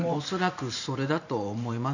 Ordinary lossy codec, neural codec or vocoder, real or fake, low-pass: none; vocoder, 44.1 kHz, 128 mel bands every 512 samples, BigVGAN v2; fake; 7.2 kHz